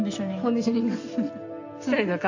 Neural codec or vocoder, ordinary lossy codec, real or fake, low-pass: none; AAC, 48 kbps; real; 7.2 kHz